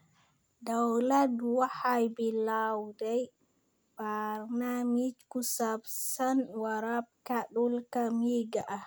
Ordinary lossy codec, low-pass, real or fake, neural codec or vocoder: none; none; real; none